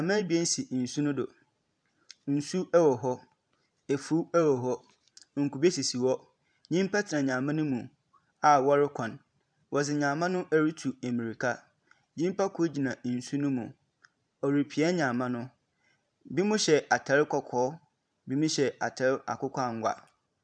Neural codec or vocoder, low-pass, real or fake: vocoder, 44.1 kHz, 128 mel bands every 512 samples, BigVGAN v2; 9.9 kHz; fake